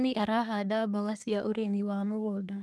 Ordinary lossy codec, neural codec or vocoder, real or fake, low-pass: none; codec, 24 kHz, 1 kbps, SNAC; fake; none